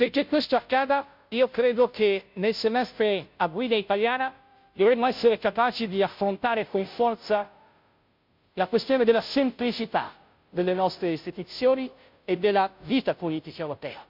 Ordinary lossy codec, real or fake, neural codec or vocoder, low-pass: MP3, 48 kbps; fake; codec, 16 kHz, 0.5 kbps, FunCodec, trained on Chinese and English, 25 frames a second; 5.4 kHz